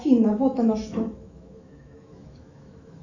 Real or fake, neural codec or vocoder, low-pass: fake; autoencoder, 48 kHz, 128 numbers a frame, DAC-VAE, trained on Japanese speech; 7.2 kHz